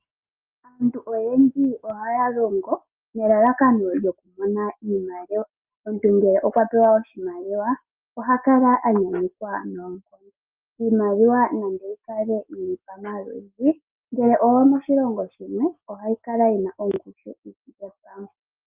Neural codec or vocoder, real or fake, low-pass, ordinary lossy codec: none; real; 3.6 kHz; Opus, 24 kbps